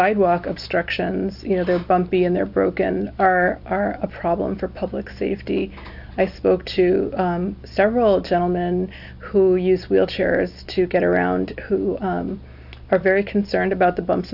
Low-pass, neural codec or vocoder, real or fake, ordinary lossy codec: 5.4 kHz; none; real; AAC, 48 kbps